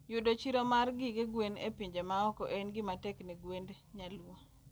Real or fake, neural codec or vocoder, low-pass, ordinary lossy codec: real; none; none; none